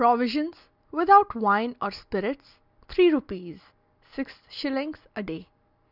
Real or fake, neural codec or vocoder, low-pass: real; none; 5.4 kHz